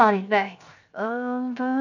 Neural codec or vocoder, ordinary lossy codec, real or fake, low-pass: codec, 16 kHz, 0.7 kbps, FocalCodec; none; fake; 7.2 kHz